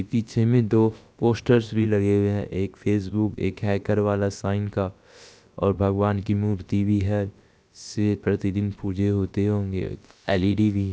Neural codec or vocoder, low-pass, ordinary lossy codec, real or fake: codec, 16 kHz, about 1 kbps, DyCAST, with the encoder's durations; none; none; fake